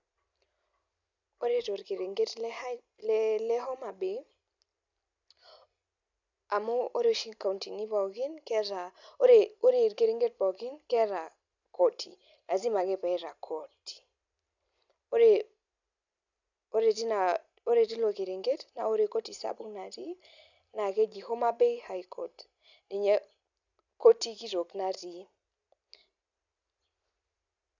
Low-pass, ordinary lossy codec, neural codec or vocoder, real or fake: 7.2 kHz; none; none; real